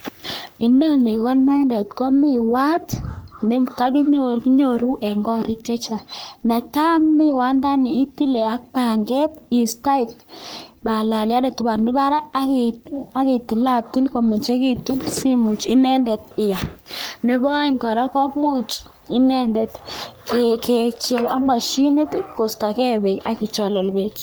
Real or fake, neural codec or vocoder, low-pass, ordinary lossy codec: fake; codec, 44.1 kHz, 3.4 kbps, Pupu-Codec; none; none